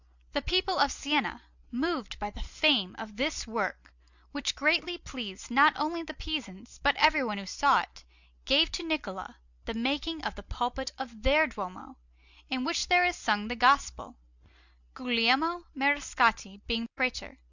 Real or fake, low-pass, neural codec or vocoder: real; 7.2 kHz; none